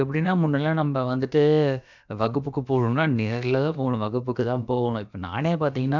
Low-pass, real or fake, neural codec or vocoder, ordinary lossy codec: 7.2 kHz; fake; codec, 16 kHz, about 1 kbps, DyCAST, with the encoder's durations; none